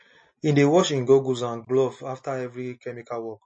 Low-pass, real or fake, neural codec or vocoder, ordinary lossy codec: 9.9 kHz; real; none; MP3, 32 kbps